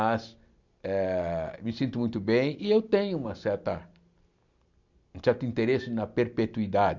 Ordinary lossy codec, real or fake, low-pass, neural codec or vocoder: none; real; 7.2 kHz; none